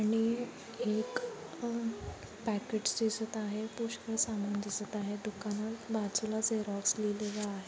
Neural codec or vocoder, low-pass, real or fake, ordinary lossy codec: none; none; real; none